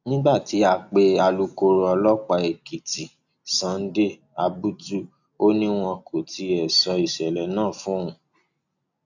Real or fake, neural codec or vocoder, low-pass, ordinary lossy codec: real; none; 7.2 kHz; none